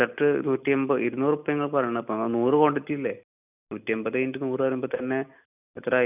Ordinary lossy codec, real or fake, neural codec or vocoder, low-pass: none; real; none; 3.6 kHz